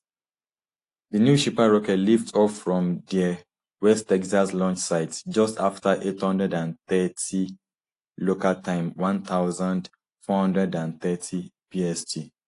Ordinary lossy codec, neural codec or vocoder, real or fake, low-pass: AAC, 48 kbps; none; real; 10.8 kHz